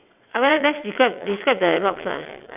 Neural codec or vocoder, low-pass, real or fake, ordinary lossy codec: vocoder, 22.05 kHz, 80 mel bands, WaveNeXt; 3.6 kHz; fake; none